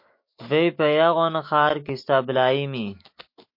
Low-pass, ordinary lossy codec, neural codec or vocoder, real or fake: 5.4 kHz; MP3, 32 kbps; autoencoder, 48 kHz, 128 numbers a frame, DAC-VAE, trained on Japanese speech; fake